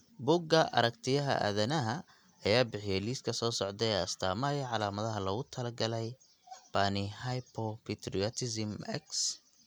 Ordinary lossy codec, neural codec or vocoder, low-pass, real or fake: none; none; none; real